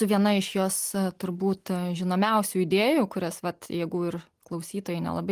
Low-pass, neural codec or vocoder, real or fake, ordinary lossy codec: 14.4 kHz; none; real; Opus, 24 kbps